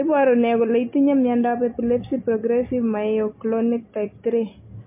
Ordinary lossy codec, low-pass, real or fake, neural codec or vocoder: MP3, 24 kbps; 3.6 kHz; real; none